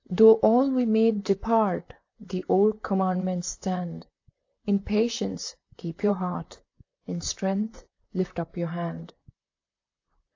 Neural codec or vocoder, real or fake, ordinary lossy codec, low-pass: vocoder, 44.1 kHz, 128 mel bands, Pupu-Vocoder; fake; AAC, 48 kbps; 7.2 kHz